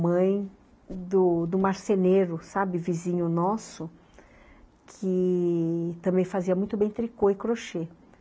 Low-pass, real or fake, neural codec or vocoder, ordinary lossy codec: none; real; none; none